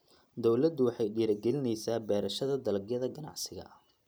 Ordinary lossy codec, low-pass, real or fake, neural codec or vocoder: none; none; real; none